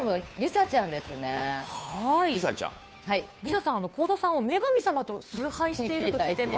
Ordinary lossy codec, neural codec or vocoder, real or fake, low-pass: none; codec, 16 kHz, 2 kbps, FunCodec, trained on Chinese and English, 25 frames a second; fake; none